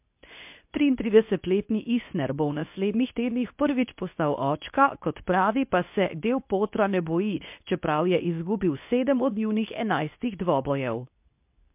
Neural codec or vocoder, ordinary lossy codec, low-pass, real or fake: codec, 24 kHz, 0.9 kbps, WavTokenizer, medium speech release version 2; MP3, 32 kbps; 3.6 kHz; fake